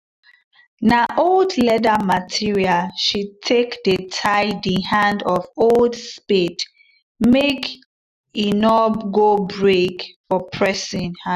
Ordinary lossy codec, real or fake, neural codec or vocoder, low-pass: none; real; none; 14.4 kHz